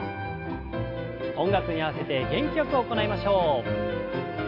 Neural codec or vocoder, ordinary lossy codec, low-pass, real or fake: none; AAC, 32 kbps; 5.4 kHz; real